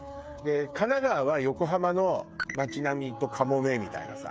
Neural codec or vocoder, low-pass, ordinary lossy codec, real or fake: codec, 16 kHz, 8 kbps, FreqCodec, smaller model; none; none; fake